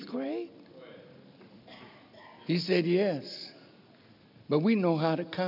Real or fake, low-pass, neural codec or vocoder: real; 5.4 kHz; none